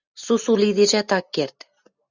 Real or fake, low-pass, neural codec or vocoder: real; 7.2 kHz; none